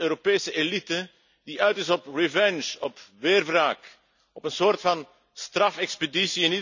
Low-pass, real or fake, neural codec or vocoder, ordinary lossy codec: 7.2 kHz; real; none; none